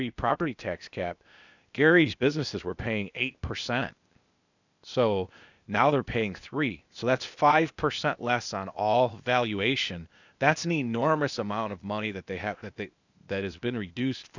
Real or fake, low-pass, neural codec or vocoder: fake; 7.2 kHz; codec, 16 kHz, 0.8 kbps, ZipCodec